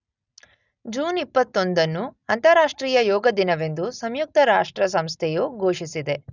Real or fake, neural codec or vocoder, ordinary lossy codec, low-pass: real; none; none; 7.2 kHz